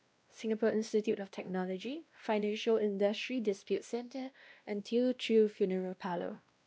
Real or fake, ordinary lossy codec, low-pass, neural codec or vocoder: fake; none; none; codec, 16 kHz, 1 kbps, X-Codec, WavLM features, trained on Multilingual LibriSpeech